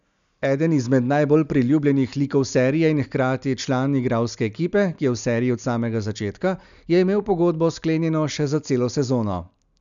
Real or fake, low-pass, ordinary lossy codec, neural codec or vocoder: real; 7.2 kHz; none; none